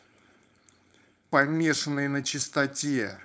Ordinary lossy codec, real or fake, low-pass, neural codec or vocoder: none; fake; none; codec, 16 kHz, 4.8 kbps, FACodec